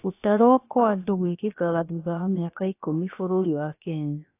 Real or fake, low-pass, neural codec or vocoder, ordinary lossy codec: fake; 3.6 kHz; codec, 16 kHz, about 1 kbps, DyCAST, with the encoder's durations; AAC, 24 kbps